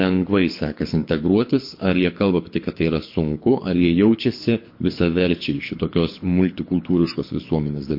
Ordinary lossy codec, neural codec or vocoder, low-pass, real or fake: MP3, 32 kbps; codec, 24 kHz, 6 kbps, HILCodec; 5.4 kHz; fake